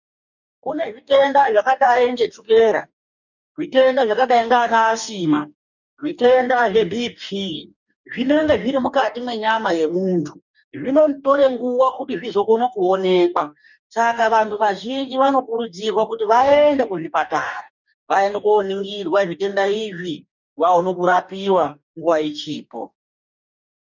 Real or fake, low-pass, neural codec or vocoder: fake; 7.2 kHz; codec, 44.1 kHz, 2.6 kbps, DAC